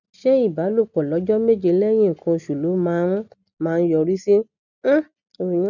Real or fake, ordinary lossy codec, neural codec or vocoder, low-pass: real; none; none; 7.2 kHz